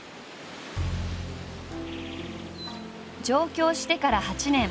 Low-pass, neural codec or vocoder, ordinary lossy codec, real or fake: none; none; none; real